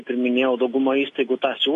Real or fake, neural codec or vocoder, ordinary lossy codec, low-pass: real; none; AAC, 48 kbps; 10.8 kHz